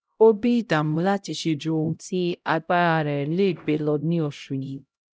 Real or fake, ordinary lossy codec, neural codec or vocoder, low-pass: fake; none; codec, 16 kHz, 0.5 kbps, X-Codec, HuBERT features, trained on LibriSpeech; none